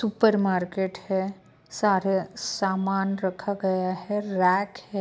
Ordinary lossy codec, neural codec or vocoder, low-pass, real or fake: none; none; none; real